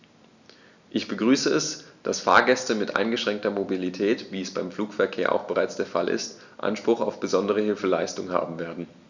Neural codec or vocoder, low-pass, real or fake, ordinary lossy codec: none; 7.2 kHz; real; none